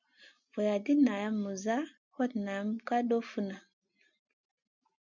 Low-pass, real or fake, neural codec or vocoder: 7.2 kHz; real; none